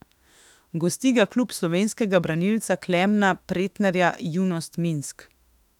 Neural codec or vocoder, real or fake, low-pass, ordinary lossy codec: autoencoder, 48 kHz, 32 numbers a frame, DAC-VAE, trained on Japanese speech; fake; 19.8 kHz; none